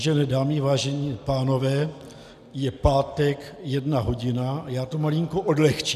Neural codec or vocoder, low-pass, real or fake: none; 14.4 kHz; real